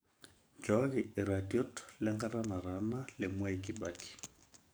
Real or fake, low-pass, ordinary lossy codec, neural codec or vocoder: fake; none; none; codec, 44.1 kHz, 7.8 kbps, DAC